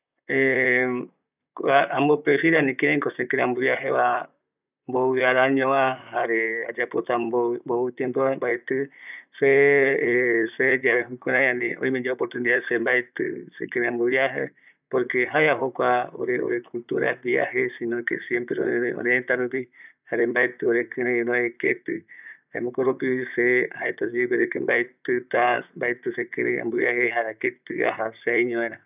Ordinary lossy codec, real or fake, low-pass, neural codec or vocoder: none; fake; 3.6 kHz; vocoder, 44.1 kHz, 128 mel bands, Pupu-Vocoder